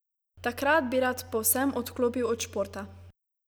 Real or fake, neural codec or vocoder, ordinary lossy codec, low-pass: real; none; none; none